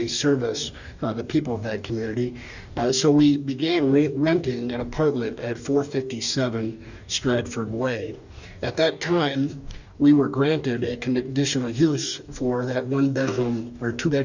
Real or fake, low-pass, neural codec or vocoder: fake; 7.2 kHz; codec, 44.1 kHz, 2.6 kbps, DAC